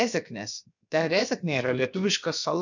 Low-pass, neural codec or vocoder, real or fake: 7.2 kHz; codec, 16 kHz, about 1 kbps, DyCAST, with the encoder's durations; fake